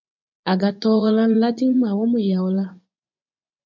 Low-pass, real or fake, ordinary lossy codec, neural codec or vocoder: 7.2 kHz; fake; MP3, 64 kbps; vocoder, 24 kHz, 100 mel bands, Vocos